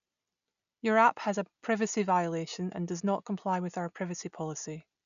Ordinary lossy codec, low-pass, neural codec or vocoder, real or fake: none; 7.2 kHz; none; real